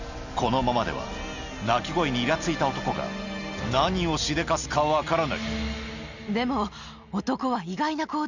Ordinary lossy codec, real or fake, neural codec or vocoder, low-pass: none; real; none; 7.2 kHz